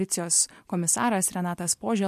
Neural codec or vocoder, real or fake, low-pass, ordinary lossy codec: none; real; 14.4 kHz; MP3, 64 kbps